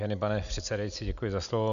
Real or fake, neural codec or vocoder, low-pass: real; none; 7.2 kHz